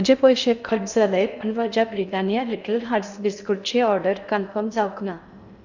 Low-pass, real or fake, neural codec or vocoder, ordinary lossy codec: 7.2 kHz; fake; codec, 16 kHz in and 24 kHz out, 0.6 kbps, FocalCodec, streaming, 2048 codes; none